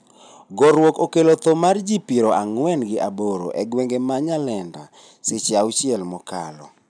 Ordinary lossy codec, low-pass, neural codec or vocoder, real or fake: none; 9.9 kHz; none; real